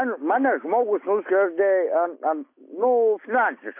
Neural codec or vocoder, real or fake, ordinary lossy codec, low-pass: none; real; MP3, 24 kbps; 3.6 kHz